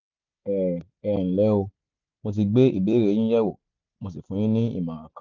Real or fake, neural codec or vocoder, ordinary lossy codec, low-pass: real; none; none; 7.2 kHz